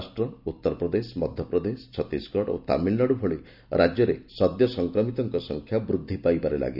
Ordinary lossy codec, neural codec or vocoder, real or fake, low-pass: none; none; real; 5.4 kHz